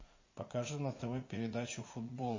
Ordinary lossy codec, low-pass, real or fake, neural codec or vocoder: MP3, 32 kbps; 7.2 kHz; fake; vocoder, 44.1 kHz, 80 mel bands, Vocos